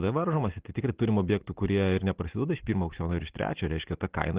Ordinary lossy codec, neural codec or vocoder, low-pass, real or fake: Opus, 16 kbps; none; 3.6 kHz; real